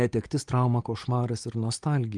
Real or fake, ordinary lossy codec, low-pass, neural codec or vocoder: real; Opus, 24 kbps; 9.9 kHz; none